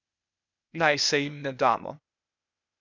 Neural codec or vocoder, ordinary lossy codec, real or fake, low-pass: codec, 16 kHz, 0.8 kbps, ZipCodec; none; fake; 7.2 kHz